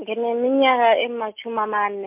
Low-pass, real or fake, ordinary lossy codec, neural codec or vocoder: 3.6 kHz; real; MP3, 32 kbps; none